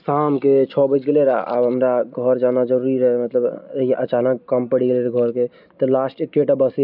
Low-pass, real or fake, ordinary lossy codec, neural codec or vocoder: 5.4 kHz; real; none; none